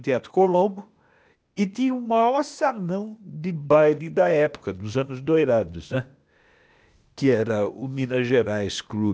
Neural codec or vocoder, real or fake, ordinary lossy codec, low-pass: codec, 16 kHz, 0.8 kbps, ZipCodec; fake; none; none